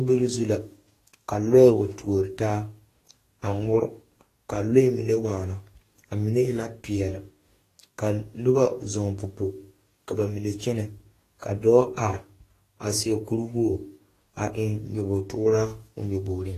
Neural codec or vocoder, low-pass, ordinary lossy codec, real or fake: codec, 44.1 kHz, 2.6 kbps, DAC; 14.4 kHz; AAC, 48 kbps; fake